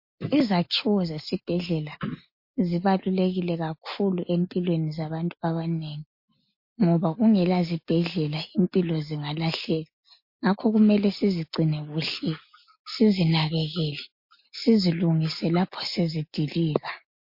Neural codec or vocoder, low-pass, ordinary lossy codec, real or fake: none; 5.4 kHz; MP3, 32 kbps; real